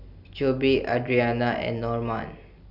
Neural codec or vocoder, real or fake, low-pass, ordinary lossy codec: none; real; 5.4 kHz; none